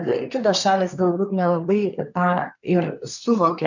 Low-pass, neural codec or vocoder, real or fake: 7.2 kHz; codec, 24 kHz, 1 kbps, SNAC; fake